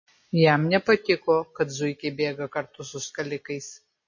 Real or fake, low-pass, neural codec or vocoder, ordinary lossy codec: real; 7.2 kHz; none; MP3, 32 kbps